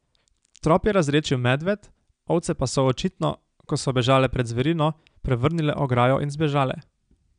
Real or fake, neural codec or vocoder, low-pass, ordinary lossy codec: real; none; 9.9 kHz; none